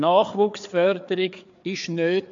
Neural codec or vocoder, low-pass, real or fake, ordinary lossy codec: codec, 16 kHz, 4 kbps, FreqCodec, larger model; 7.2 kHz; fake; AAC, 64 kbps